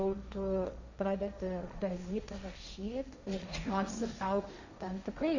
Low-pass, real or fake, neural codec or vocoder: 7.2 kHz; fake; codec, 16 kHz, 1.1 kbps, Voila-Tokenizer